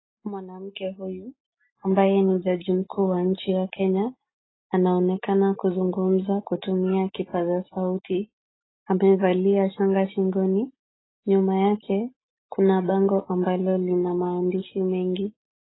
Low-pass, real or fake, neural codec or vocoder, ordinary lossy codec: 7.2 kHz; real; none; AAC, 16 kbps